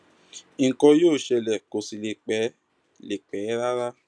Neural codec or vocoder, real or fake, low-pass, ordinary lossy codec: none; real; none; none